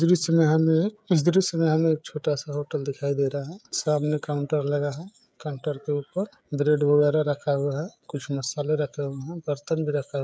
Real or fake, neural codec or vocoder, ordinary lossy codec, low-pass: fake; codec, 16 kHz, 16 kbps, FreqCodec, smaller model; none; none